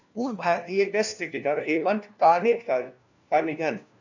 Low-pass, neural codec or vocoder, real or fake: 7.2 kHz; codec, 16 kHz, 1 kbps, FunCodec, trained on LibriTTS, 50 frames a second; fake